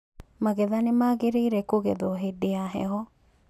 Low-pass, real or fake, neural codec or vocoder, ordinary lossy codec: 14.4 kHz; real; none; none